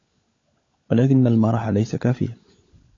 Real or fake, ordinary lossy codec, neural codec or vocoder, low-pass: fake; AAC, 32 kbps; codec, 16 kHz, 16 kbps, FunCodec, trained on LibriTTS, 50 frames a second; 7.2 kHz